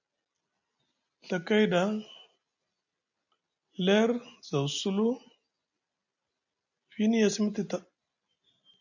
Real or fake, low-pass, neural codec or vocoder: real; 7.2 kHz; none